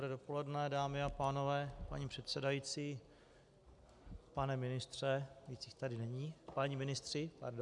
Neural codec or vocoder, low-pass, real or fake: none; 10.8 kHz; real